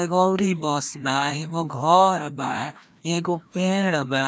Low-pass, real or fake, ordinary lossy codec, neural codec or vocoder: none; fake; none; codec, 16 kHz, 1 kbps, FreqCodec, larger model